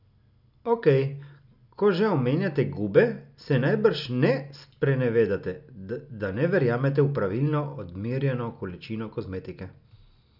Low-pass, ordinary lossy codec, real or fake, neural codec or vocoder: 5.4 kHz; none; real; none